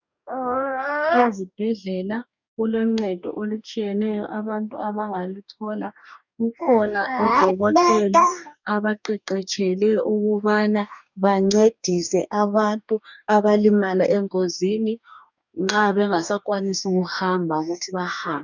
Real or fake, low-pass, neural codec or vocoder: fake; 7.2 kHz; codec, 44.1 kHz, 2.6 kbps, DAC